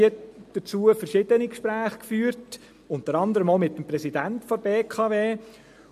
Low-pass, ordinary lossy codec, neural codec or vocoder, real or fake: 14.4 kHz; MP3, 64 kbps; none; real